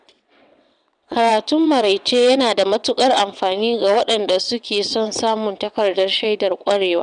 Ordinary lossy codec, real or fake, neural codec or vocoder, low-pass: none; fake; vocoder, 22.05 kHz, 80 mel bands, WaveNeXt; 9.9 kHz